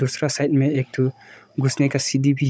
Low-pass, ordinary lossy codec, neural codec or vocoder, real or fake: none; none; codec, 16 kHz, 16 kbps, FunCodec, trained on Chinese and English, 50 frames a second; fake